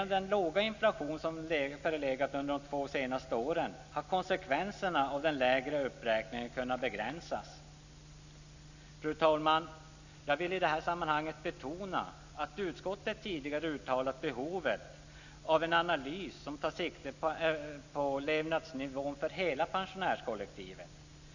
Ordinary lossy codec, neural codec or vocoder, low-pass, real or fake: none; none; 7.2 kHz; real